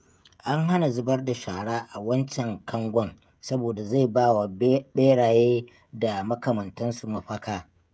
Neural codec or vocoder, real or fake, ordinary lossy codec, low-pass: codec, 16 kHz, 16 kbps, FreqCodec, smaller model; fake; none; none